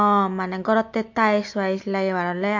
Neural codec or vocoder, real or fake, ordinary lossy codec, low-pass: none; real; MP3, 48 kbps; 7.2 kHz